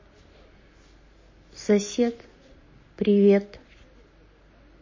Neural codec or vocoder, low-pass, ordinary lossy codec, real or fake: none; 7.2 kHz; MP3, 32 kbps; real